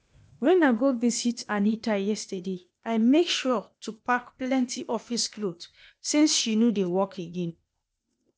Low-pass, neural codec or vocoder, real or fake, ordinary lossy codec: none; codec, 16 kHz, 0.8 kbps, ZipCodec; fake; none